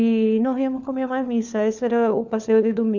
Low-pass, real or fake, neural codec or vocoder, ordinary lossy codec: 7.2 kHz; fake; codec, 16 kHz, 4 kbps, FunCodec, trained on LibriTTS, 50 frames a second; none